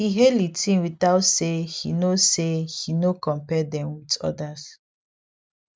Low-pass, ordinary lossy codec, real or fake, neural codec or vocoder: none; none; real; none